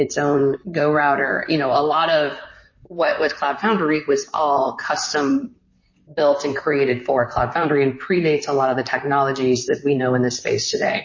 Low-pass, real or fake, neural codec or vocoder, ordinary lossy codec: 7.2 kHz; fake; vocoder, 44.1 kHz, 128 mel bands, Pupu-Vocoder; MP3, 32 kbps